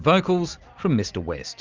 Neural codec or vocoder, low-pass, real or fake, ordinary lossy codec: none; 7.2 kHz; real; Opus, 32 kbps